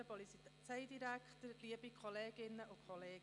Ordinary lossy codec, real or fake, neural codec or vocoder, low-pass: none; real; none; 10.8 kHz